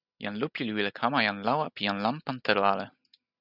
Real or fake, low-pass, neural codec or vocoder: real; 5.4 kHz; none